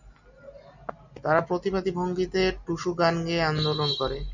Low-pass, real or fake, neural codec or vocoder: 7.2 kHz; real; none